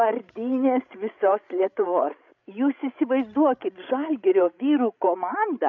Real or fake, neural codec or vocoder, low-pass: fake; codec, 16 kHz, 16 kbps, FreqCodec, larger model; 7.2 kHz